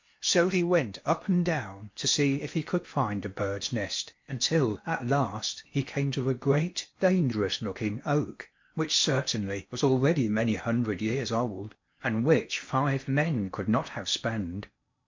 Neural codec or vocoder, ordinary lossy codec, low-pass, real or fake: codec, 16 kHz in and 24 kHz out, 0.8 kbps, FocalCodec, streaming, 65536 codes; MP3, 48 kbps; 7.2 kHz; fake